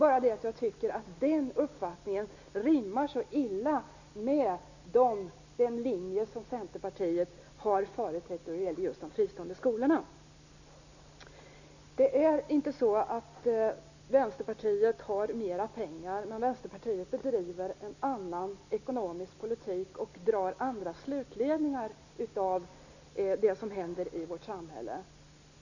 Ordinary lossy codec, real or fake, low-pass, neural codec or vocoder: none; real; 7.2 kHz; none